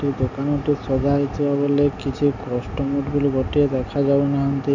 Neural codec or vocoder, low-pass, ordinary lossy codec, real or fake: none; 7.2 kHz; none; real